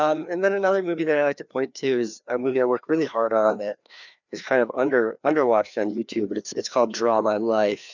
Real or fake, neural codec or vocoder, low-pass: fake; codec, 16 kHz, 2 kbps, FreqCodec, larger model; 7.2 kHz